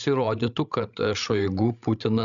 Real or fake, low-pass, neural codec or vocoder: fake; 7.2 kHz; codec, 16 kHz, 16 kbps, FreqCodec, larger model